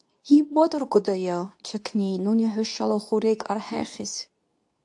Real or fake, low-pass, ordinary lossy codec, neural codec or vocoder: fake; 10.8 kHz; AAC, 64 kbps; codec, 24 kHz, 0.9 kbps, WavTokenizer, medium speech release version 2